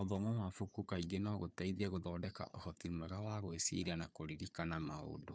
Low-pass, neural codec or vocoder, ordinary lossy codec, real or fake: none; codec, 16 kHz, 4 kbps, FunCodec, trained on Chinese and English, 50 frames a second; none; fake